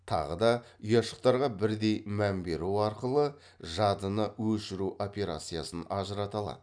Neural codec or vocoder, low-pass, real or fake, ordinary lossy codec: none; 9.9 kHz; real; none